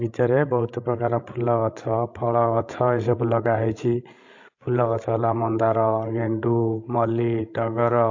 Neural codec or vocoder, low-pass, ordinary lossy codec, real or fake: codec, 16 kHz, 16 kbps, FreqCodec, larger model; 7.2 kHz; AAC, 48 kbps; fake